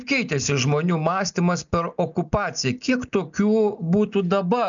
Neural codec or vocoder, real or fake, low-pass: none; real; 7.2 kHz